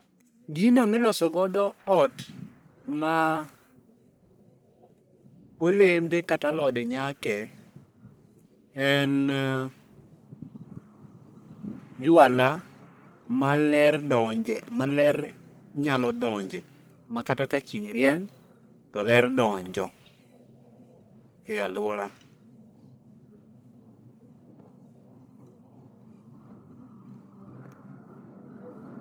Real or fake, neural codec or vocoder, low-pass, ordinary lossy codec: fake; codec, 44.1 kHz, 1.7 kbps, Pupu-Codec; none; none